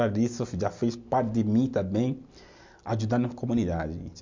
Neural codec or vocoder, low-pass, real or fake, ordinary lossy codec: none; 7.2 kHz; real; MP3, 64 kbps